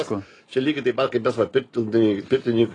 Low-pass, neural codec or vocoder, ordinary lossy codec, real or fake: 10.8 kHz; none; AAC, 32 kbps; real